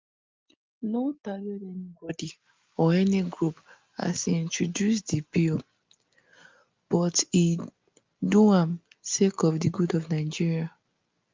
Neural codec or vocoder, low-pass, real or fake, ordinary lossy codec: none; 7.2 kHz; real; Opus, 24 kbps